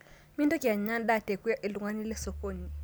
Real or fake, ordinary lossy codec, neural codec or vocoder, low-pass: real; none; none; none